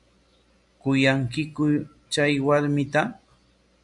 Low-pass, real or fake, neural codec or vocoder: 10.8 kHz; real; none